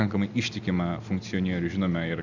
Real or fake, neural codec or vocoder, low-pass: real; none; 7.2 kHz